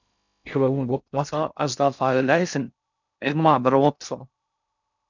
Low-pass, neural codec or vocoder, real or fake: 7.2 kHz; codec, 16 kHz in and 24 kHz out, 0.6 kbps, FocalCodec, streaming, 2048 codes; fake